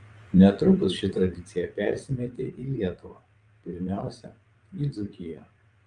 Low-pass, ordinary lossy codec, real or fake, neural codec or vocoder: 9.9 kHz; Opus, 32 kbps; fake; vocoder, 22.05 kHz, 80 mel bands, WaveNeXt